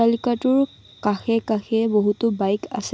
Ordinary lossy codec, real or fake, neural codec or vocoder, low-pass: none; real; none; none